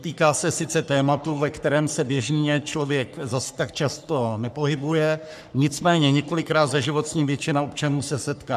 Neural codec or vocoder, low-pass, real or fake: codec, 44.1 kHz, 3.4 kbps, Pupu-Codec; 14.4 kHz; fake